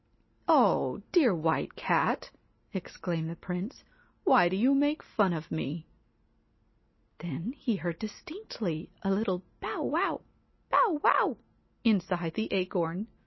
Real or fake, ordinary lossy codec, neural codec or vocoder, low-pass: real; MP3, 24 kbps; none; 7.2 kHz